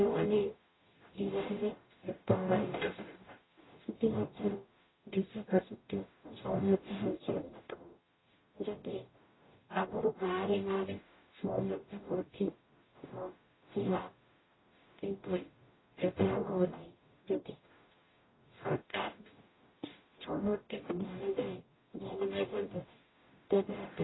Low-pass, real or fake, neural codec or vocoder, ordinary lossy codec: 7.2 kHz; fake; codec, 44.1 kHz, 0.9 kbps, DAC; AAC, 16 kbps